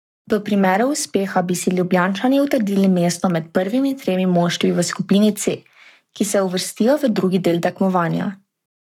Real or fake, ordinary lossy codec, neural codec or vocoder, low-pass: fake; none; codec, 44.1 kHz, 7.8 kbps, Pupu-Codec; 19.8 kHz